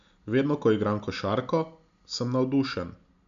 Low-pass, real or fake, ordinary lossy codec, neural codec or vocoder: 7.2 kHz; real; MP3, 64 kbps; none